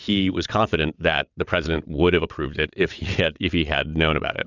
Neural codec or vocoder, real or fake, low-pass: vocoder, 22.05 kHz, 80 mel bands, WaveNeXt; fake; 7.2 kHz